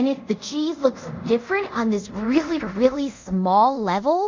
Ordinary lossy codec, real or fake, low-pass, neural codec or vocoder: MP3, 64 kbps; fake; 7.2 kHz; codec, 24 kHz, 0.5 kbps, DualCodec